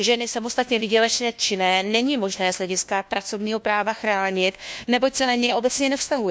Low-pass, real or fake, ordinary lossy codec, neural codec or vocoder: none; fake; none; codec, 16 kHz, 1 kbps, FunCodec, trained on LibriTTS, 50 frames a second